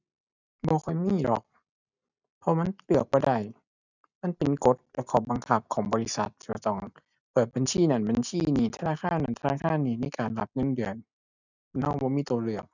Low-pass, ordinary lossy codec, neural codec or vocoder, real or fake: 7.2 kHz; none; none; real